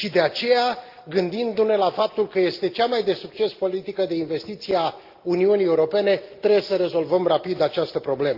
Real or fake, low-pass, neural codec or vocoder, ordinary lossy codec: real; 5.4 kHz; none; Opus, 32 kbps